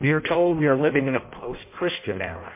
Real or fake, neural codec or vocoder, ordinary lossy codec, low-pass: fake; codec, 16 kHz in and 24 kHz out, 0.6 kbps, FireRedTTS-2 codec; MP3, 24 kbps; 3.6 kHz